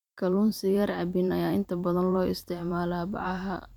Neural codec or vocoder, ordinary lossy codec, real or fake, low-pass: vocoder, 44.1 kHz, 128 mel bands every 512 samples, BigVGAN v2; none; fake; 19.8 kHz